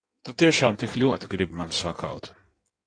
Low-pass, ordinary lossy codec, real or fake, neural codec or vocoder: 9.9 kHz; AAC, 48 kbps; fake; codec, 16 kHz in and 24 kHz out, 1.1 kbps, FireRedTTS-2 codec